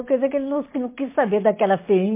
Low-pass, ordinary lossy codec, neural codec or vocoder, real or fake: 3.6 kHz; MP3, 24 kbps; none; real